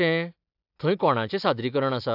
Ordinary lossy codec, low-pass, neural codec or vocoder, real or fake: AAC, 48 kbps; 5.4 kHz; none; real